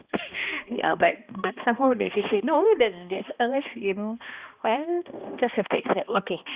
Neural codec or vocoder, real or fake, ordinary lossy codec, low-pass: codec, 16 kHz, 1 kbps, X-Codec, HuBERT features, trained on balanced general audio; fake; Opus, 64 kbps; 3.6 kHz